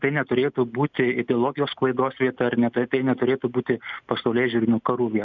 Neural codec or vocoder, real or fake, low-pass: none; real; 7.2 kHz